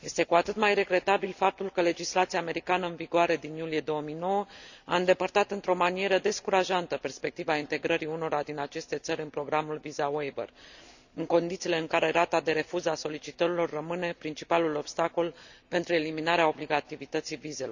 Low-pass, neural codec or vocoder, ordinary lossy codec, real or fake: 7.2 kHz; none; none; real